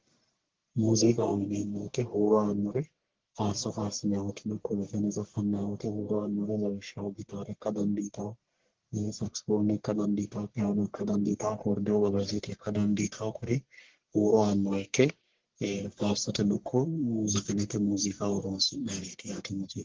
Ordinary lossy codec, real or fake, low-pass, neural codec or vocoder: Opus, 16 kbps; fake; 7.2 kHz; codec, 44.1 kHz, 1.7 kbps, Pupu-Codec